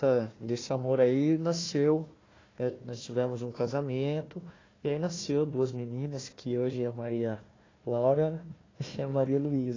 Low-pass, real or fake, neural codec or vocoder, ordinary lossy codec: 7.2 kHz; fake; codec, 16 kHz, 1 kbps, FunCodec, trained on Chinese and English, 50 frames a second; AAC, 32 kbps